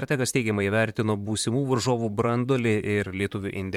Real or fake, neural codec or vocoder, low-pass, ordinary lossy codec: fake; vocoder, 44.1 kHz, 128 mel bands, Pupu-Vocoder; 19.8 kHz; MP3, 96 kbps